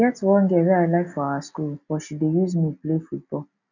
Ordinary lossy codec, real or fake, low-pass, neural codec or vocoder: none; real; 7.2 kHz; none